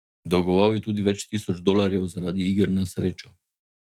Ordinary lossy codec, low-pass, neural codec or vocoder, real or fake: none; 19.8 kHz; codec, 44.1 kHz, 7.8 kbps, DAC; fake